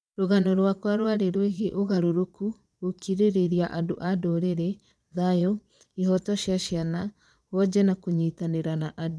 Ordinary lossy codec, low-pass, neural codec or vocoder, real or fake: none; none; vocoder, 22.05 kHz, 80 mel bands, Vocos; fake